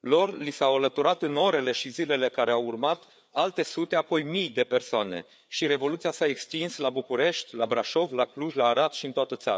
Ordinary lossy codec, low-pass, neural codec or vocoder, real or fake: none; none; codec, 16 kHz, 4 kbps, FreqCodec, larger model; fake